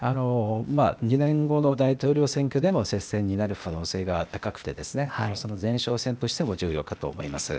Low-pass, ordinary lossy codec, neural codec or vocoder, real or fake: none; none; codec, 16 kHz, 0.8 kbps, ZipCodec; fake